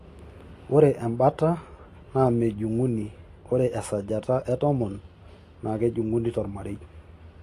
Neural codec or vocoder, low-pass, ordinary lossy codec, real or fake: none; 14.4 kHz; AAC, 48 kbps; real